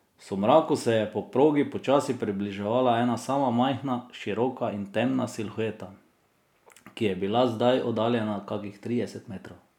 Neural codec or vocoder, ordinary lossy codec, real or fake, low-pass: none; none; real; 19.8 kHz